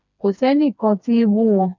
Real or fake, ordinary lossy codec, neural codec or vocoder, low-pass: fake; none; codec, 16 kHz, 2 kbps, FreqCodec, smaller model; 7.2 kHz